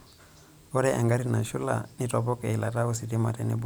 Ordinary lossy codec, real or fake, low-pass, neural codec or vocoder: none; fake; none; vocoder, 44.1 kHz, 128 mel bands every 512 samples, BigVGAN v2